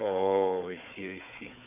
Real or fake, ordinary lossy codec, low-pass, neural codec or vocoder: fake; none; 3.6 kHz; codec, 16 kHz, 4 kbps, FunCodec, trained on Chinese and English, 50 frames a second